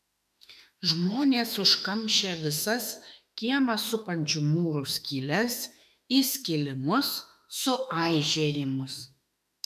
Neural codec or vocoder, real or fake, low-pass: autoencoder, 48 kHz, 32 numbers a frame, DAC-VAE, trained on Japanese speech; fake; 14.4 kHz